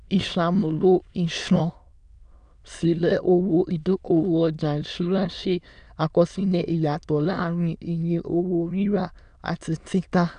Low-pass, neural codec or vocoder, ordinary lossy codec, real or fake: 9.9 kHz; autoencoder, 22.05 kHz, a latent of 192 numbers a frame, VITS, trained on many speakers; none; fake